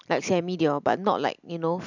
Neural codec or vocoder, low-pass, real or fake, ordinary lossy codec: none; 7.2 kHz; real; none